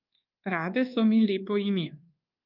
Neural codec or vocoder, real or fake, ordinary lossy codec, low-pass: codec, 24 kHz, 1.2 kbps, DualCodec; fake; Opus, 24 kbps; 5.4 kHz